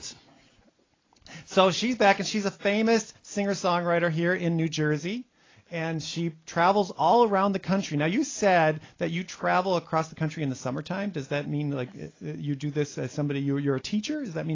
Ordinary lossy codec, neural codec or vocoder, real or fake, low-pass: AAC, 32 kbps; none; real; 7.2 kHz